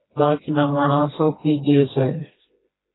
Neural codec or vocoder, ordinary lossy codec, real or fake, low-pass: codec, 16 kHz, 1 kbps, FreqCodec, smaller model; AAC, 16 kbps; fake; 7.2 kHz